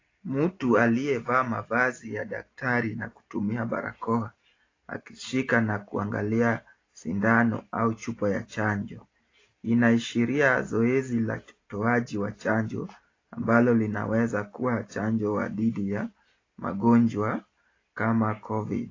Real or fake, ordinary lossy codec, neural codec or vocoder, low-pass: real; AAC, 32 kbps; none; 7.2 kHz